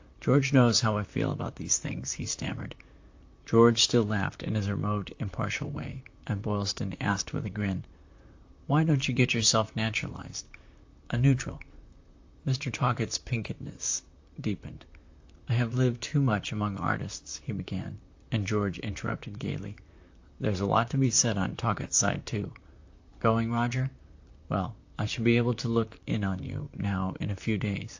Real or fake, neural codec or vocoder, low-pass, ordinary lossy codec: fake; vocoder, 44.1 kHz, 128 mel bands, Pupu-Vocoder; 7.2 kHz; AAC, 48 kbps